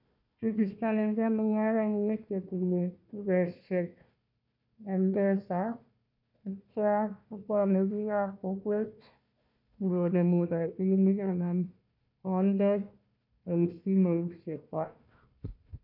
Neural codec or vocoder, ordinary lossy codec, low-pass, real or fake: codec, 16 kHz, 1 kbps, FunCodec, trained on Chinese and English, 50 frames a second; none; 5.4 kHz; fake